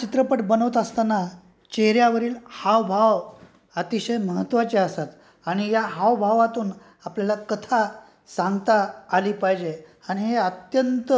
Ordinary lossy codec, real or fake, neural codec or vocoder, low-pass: none; real; none; none